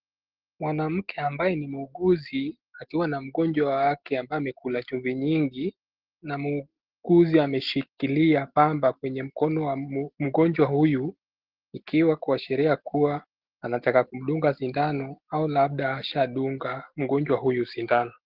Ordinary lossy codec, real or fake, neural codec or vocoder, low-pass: Opus, 16 kbps; real; none; 5.4 kHz